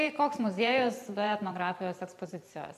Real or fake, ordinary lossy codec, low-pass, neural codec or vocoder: fake; AAC, 96 kbps; 14.4 kHz; vocoder, 44.1 kHz, 128 mel bands every 512 samples, BigVGAN v2